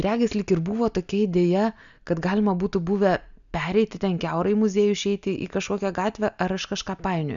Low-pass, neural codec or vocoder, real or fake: 7.2 kHz; none; real